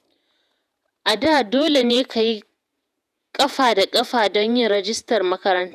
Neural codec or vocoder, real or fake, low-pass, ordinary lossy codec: vocoder, 48 kHz, 128 mel bands, Vocos; fake; 14.4 kHz; none